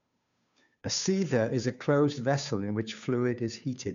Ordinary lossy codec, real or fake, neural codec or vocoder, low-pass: none; fake; codec, 16 kHz, 2 kbps, FunCodec, trained on Chinese and English, 25 frames a second; 7.2 kHz